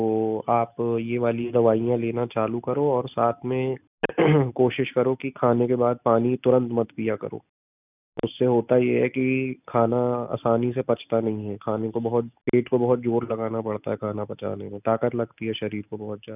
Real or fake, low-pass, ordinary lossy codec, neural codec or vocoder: real; 3.6 kHz; none; none